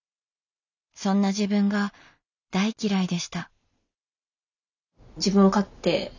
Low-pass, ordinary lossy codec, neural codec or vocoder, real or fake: 7.2 kHz; none; none; real